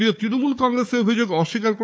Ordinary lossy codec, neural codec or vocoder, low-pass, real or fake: none; codec, 16 kHz, 4 kbps, FunCodec, trained on Chinese and English, 50 frames a second; none; fake